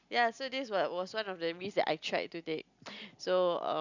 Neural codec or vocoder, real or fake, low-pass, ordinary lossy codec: none; real; 7.2 kHz; none